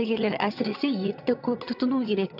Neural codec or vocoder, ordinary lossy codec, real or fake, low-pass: vocoder, 22.05 kHz, 80 mel bands, HiFi-GAN; AAC, 48 kbps; fake; 5.4 kHz